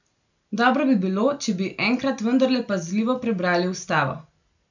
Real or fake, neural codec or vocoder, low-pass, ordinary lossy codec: real; none; 7.2 kHz; none